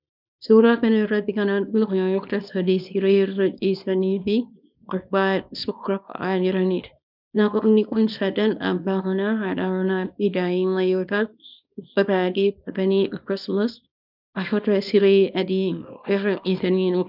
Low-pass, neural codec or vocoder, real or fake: 5.4 kHz; codec, 24 kHz, 0.9 kbps, WavTokenizer, small release; fake